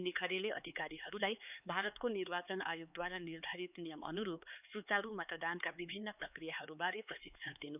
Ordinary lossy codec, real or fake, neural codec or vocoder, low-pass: none; fake; codec, 16 kHz, 4 kbps, X-Codec, HuBERT features, trained on LibriSpeech; 3.6 kHz